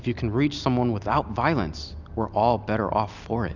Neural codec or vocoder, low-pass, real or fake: none; 7.2 kHz; real